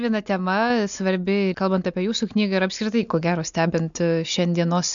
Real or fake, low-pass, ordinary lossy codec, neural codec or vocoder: real; 7.2 kHz; MP3, 64 kbps; none